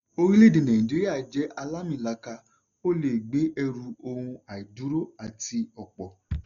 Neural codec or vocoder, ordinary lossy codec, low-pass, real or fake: none; none; 7.2 kHz; real